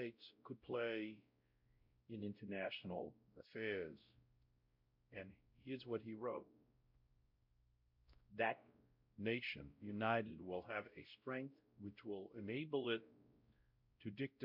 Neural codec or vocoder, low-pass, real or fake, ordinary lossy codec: codec, 16 kHz, 0.5 kbps, X-Codec, WavLM features, trained on Multilingual LibriSpeech; 5.4 kHz; fake; MP3, 48 kbps